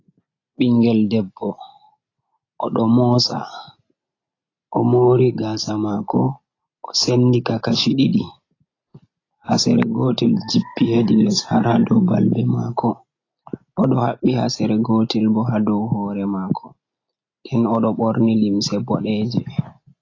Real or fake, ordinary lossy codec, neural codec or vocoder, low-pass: real; AAC, 32 kbps; none; 7.2 kHz